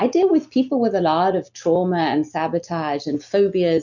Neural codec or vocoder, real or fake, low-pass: none; real; 7.2 kHz